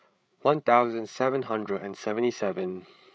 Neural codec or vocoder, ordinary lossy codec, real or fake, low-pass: codec, 16 kHz, 8 kbps, FreqCodec, larger model; none; fake; none